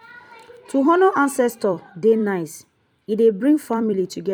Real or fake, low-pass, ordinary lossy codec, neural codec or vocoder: fake; 19.8 kHz; none; vocoder, 44.1 kHz, 128 mel bands every 256 samples, BigVGAN v2